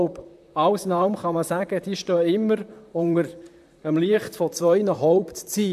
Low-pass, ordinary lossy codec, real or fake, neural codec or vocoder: 14.4 kHz; none; fake; vocoder, 44.1 kHz, 128 mel bands, Pupu-Vocoder